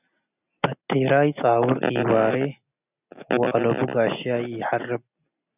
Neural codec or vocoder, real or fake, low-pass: none; real; 3.6 kHz